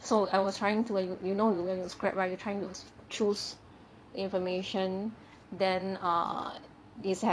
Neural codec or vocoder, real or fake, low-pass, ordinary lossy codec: vocoder, 22.05 kHz, 80 mel bands, Vocos; fake; none; none